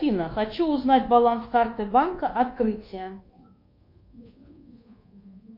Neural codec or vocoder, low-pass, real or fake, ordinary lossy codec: codec, 24 kHz, 1.2 kbps, DualCodec; 5.4 kHz; fake; MP3, 32 kbps